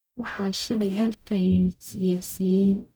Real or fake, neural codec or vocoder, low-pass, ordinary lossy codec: fake; codec, 44.1 kHz, 0.9 kbps, DAC; none; none